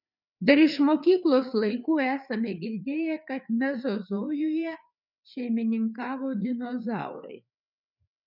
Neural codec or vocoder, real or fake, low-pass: codec, 16 kHz, 4 kbps, FreqCodec, larger model; fake; 5.4 kHz